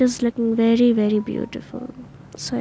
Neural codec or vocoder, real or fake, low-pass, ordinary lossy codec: none; real; none; none